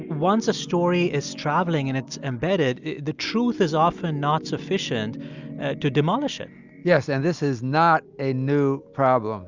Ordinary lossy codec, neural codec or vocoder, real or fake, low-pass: Opus, 64 kbps; none; real; 7.2 kHz